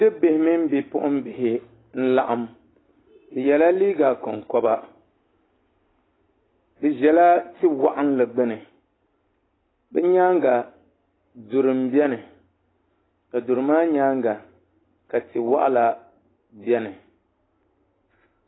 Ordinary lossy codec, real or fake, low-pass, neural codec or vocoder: AAC, 16 kbps; real; 7.2 kHz; none